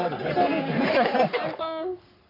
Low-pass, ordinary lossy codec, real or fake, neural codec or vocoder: 5.4 kHz; none; fake; codec, 44.1 kHz, 3.4 kbps, Pupu-Codec